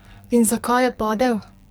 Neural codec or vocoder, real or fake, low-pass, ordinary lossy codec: codec, 44.1 kHz, 2.6 kbps, SNAC; fake; none; none